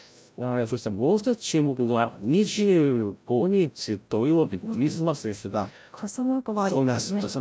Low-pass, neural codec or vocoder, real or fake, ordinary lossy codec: none; codec, 16 kHz, 0.5 kbps, FreqCodec, larger model; fake; none